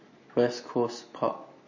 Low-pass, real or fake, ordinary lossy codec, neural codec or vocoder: 7.2 kHz; real; MP3, 32 kbps; none